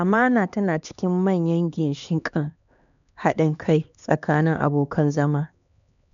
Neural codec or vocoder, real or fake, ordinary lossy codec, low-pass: codec, 16 kHz, 2 kbps, X-Codec, HuBERT features, trained on LibriSpeech; fake; none; 7.2 kHz